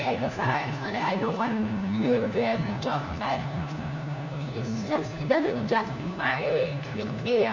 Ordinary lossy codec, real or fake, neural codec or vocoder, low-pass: none; fake; codec, 16 kHz, 1 kbps, FunCodec, trained on LibriTTS, 50 frames a second; 7.2 kHz